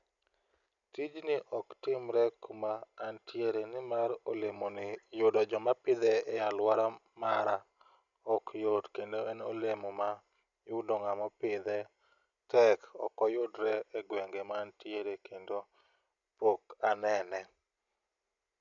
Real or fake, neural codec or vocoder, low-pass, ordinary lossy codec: real; none; 7.2 kHz; none